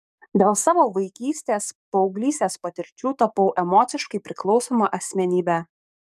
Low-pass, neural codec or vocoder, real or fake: 14.4 kHz; codec, 44.1 kHz, 7.8 kbps, DAC; fake